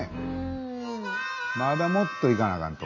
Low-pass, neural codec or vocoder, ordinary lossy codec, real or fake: 7.2 kHz; none; AAC, 48 kbps; real